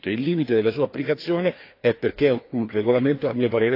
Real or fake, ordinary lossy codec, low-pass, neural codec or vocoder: fake; AAC, 32 kbps; 5.4 kHz; codec, 16 kHz, 2 kbps, FreqCodec, larger model